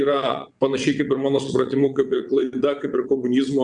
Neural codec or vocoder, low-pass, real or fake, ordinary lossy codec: vocoder, 22.05 kHz, 80 mel bands, WaveNeXt; 9.9 kHz; fake; Opus, 64 kbps